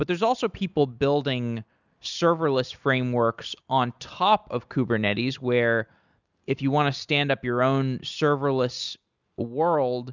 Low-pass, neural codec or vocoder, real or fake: 7.2 kHz; none; real